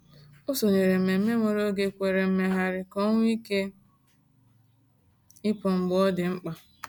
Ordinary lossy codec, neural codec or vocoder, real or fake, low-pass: none; none; real; none